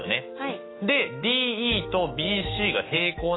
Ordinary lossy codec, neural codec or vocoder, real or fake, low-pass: AAC, 16 kbps; autoencoder, 48 kHz, 128 numbers a frame, DAC-VAE, trained on Japanese speech; fake; 7.2 kHz